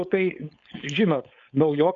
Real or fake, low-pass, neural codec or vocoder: fake; 7.2 kHz; codec, 16 kHz, 4.8 kbps, FACodec